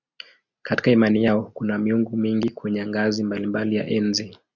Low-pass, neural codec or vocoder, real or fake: 7.2 kHz; none; real